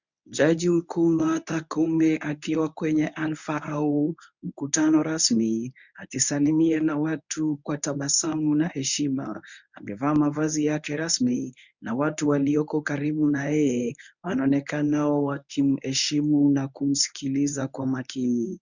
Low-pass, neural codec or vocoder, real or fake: 7.2 kHz; codec, 24 kHz, 0.9 kbps, WavTokenizer, medium speech release version 1; fake